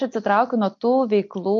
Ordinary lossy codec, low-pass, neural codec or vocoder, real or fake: MP3, 48 kbps; 7.2 kHz; none; real